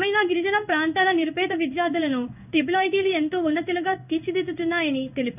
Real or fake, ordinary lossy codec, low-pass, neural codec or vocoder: fake; none; 3.6 kHz; codec, 16 kHz in and 24 kHz out, 1 kbps, XY-Tokenizer